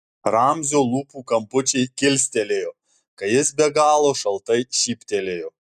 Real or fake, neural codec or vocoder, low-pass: real; none; 14.4 kHz